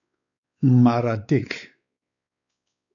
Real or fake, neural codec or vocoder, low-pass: fake; codec, 16 kHz, 4 kbps, X-Codec, WavLM features, trained on Multilingual LibriSpeech; 7.2 kHz